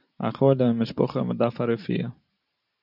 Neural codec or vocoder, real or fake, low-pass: vocoder, 44.1 kHz, 128 mel bands every 512 samples, BigVGAN v2; fake; 5.4 kHz